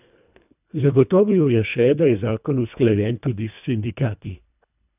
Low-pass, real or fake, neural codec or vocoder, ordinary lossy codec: 3.6 kHz; fake; codec, 24 kHz, 1.5 kbps, HILCodec; none